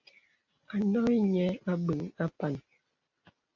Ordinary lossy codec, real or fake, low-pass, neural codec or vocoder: AAC, 48 kbps; real; 7.2 kHz; none